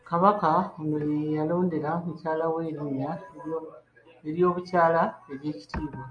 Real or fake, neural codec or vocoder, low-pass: real; none; 9.9 kHz